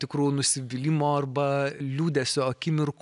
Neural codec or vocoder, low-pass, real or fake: none; 10.8 kHz; real